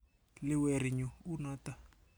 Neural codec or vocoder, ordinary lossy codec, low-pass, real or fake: none; none; none; real